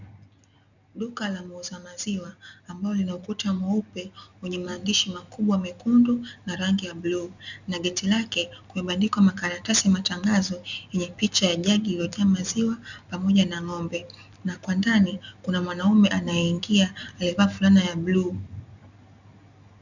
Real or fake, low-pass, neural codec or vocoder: real; 7.2 kHz; none